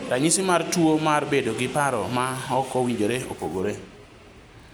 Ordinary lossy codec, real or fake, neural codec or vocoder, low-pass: none; real; none; none